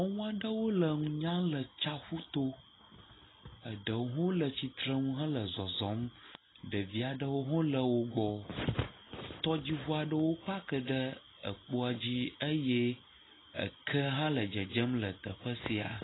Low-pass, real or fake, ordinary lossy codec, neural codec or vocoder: 7.2 kHz; real; AAC, 16 kbps; none